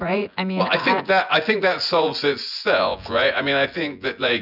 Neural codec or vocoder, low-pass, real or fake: vocoder, 24 kHz, 100 mel bands, Vocos; 5.4 kHz; fake